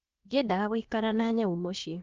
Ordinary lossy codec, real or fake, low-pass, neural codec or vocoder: Opus, 24 kbps; fake; 7.2 kHz; codec, 16 kHz, about 1 kbps, DyCAST, with the encoder's durations